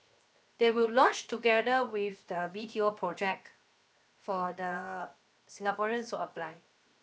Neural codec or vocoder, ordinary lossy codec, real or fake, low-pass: codec, 16 kHz, 0.7 kbps, FocalCodec; none; fake; none